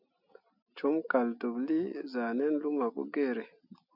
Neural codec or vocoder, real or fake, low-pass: none; real; 5.4 kHz